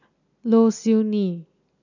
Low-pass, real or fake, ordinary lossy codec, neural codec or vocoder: 7.2 kHz; real; none; none